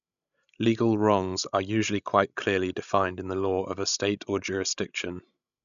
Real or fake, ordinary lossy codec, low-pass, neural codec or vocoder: fake; none; 7.2 kHz; codec, 16 kHz, 16 kbps, FreqCodec, larger model